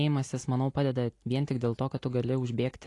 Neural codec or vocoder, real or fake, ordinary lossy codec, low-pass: none; real; AAC, 48 kbps; 10.8 kHz